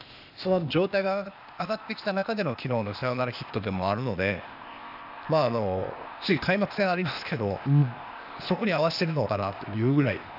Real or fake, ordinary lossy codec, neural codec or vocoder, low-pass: fake; none; codec, 16 kHz, 0.8 kbps, ZipCodec; 5.4 kHz